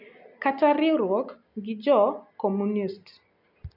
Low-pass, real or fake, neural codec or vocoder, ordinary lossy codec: 5.4 kHz; real; none; none